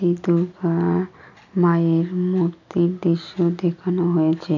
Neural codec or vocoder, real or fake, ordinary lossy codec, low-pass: none; real; none; 7.2 kHz